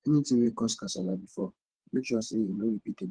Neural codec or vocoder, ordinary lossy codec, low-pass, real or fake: vocoder, 22.05 kHz, 80 mel bands, WaveNeXt; Opus, 16 kbps; 9.9 kHz; fake